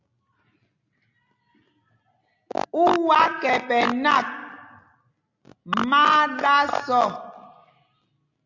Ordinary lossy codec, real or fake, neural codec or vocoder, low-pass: AAC, 48 kbps; real; none; 7.2 kHz